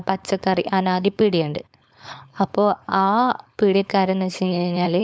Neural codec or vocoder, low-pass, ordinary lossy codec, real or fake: codec, 16 kHz, 4.8 kbps, FACodec; none; none; fake